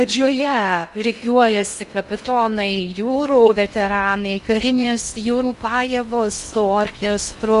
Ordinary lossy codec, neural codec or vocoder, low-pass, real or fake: Opus, 64 kbps; codec, 16 kHz in and 24 kHz out, 0.6 kbps, FocalCodec, streaming, 2048 codes; 10.8 kHz; fake